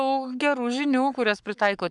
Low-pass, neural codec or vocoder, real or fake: 10.8 kHz; codec, 44.1 kHz, 7.8 kbps, Pupu-Codec; fake